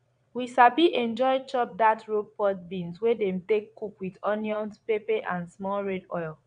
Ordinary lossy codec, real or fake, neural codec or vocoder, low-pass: AAC, 96 kbps; fake; vocoder, 22.05 kHz, 80 mel bands, Vocos; 9.9 kHz